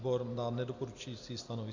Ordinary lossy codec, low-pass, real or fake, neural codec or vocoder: AAC, 48 kbps; 7.2 kHz; real; none